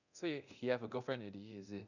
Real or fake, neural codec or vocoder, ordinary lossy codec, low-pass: fake; codec, 24 kHz, 0.9 kbps, DualCodec; none; 7.2 kHz